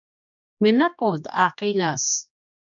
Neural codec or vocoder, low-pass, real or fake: codec, 16 kHz, 2 kbps, X-Codec, HuBERT features, trained on general audio; 7.2 kHz; fake